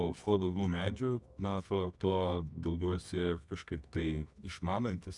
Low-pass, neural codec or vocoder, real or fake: 10.8 kHz; codec, 24 kHz, 0.9 kbps, WavTokenizer, medium music audio release; fake